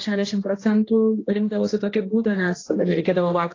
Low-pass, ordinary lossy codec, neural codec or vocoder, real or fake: 7.2 kHz; AAC, 32 kbps; codec, 16 kHz, 1 kbps, X-Codec, HuBERT features, trained on general audio; fake